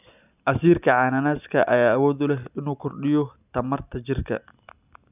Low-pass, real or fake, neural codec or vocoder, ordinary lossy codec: 3.6 kHz; real; none; none